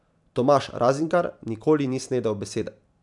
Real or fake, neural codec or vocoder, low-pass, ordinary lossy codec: fake; vocoder, 44.1 kHz, 128 mel bands every 256 samples, BigVGAN v2; 10.8 kHz; none